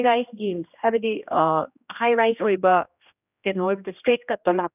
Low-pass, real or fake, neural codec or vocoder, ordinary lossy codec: 3.6 kHz; fake; codec, 16 kHz, 1 kbps, X-Codec, HuBERT features, trained on general audio; none